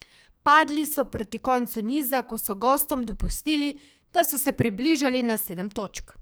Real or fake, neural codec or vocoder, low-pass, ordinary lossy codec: fake; codec, 44.1 kHz, 2.6 kbps, SNAC; none; none